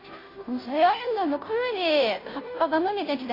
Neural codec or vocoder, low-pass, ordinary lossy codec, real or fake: codec, 16 kHz, 0.5 kbps, FunCodec, trained on Chinese and English, 25 frames a second; 5.4 kHz; AAC, 24 kbps; fake